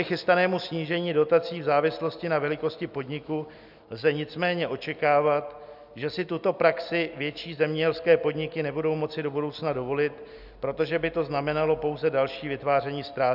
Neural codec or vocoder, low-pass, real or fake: none; 5.4 kHz; real